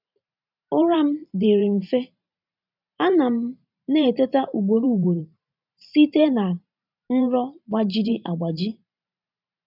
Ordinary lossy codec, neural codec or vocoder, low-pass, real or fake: none; vocoder, 44.1 kHz, 128 mel bands every 512 samples, BigVGAN v2; 5.4 kHz; fake